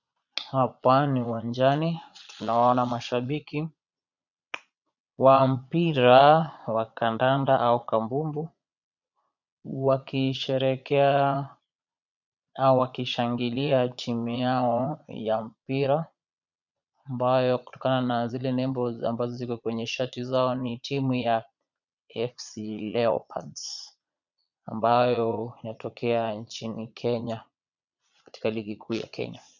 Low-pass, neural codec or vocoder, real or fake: 7.2 kHz; vocoder, 22.05 kHz, 80 mel bands, Vocos; fake